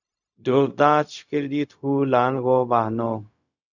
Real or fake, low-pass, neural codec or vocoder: fake; 7.2 kHz; codec, 16 kHz, 0.4 kbps, LongCat-Audio-Codec